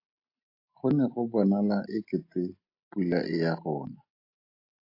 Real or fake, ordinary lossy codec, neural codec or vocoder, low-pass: real; AAC, 32 kbps; none; 5.4 kHz